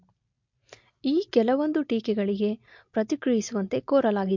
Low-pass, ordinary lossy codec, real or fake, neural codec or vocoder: 7.2 kHz; MP3, 48 kbps; real; none